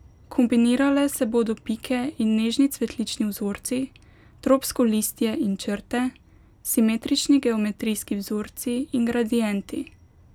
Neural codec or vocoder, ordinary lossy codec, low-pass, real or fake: none; none; 19.8 kHz; real